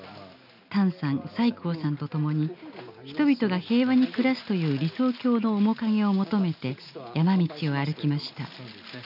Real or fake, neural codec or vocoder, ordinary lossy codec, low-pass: real; none; none; 5.4 kHz